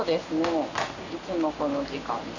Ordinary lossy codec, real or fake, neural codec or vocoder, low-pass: none; real; none; 7.2 kHz